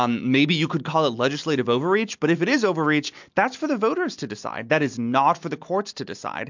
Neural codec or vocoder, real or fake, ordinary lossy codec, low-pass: none; real; MP3, 64 kbps; 7.2 kHz